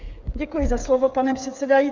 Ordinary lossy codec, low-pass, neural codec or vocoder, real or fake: MP3, 64 kbps; 7.2 kHz; codec, 16 kHz, 8 kbps, FreqCodec, smaller model; fake